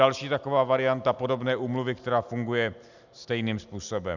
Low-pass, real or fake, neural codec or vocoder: 7.2 kHz; real; none